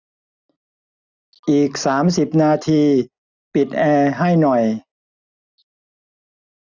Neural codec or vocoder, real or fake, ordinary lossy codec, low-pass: none; real; none; 7.2 kHz